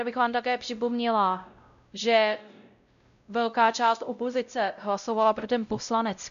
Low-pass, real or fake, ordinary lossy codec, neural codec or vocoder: 7.2 kHz; fake; MP3, 96 kbps; codec, 16 kHz, 0.5 kbps, X-Codec, WavLM features, trained on Multilingual LibriSpeech